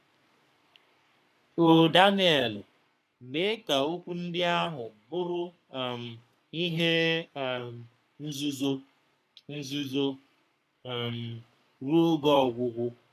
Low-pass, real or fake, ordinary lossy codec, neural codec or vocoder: 14.4 kHz; fake; none; codec, 44.1 kHz, 3.4 kbps, Pupu-Codec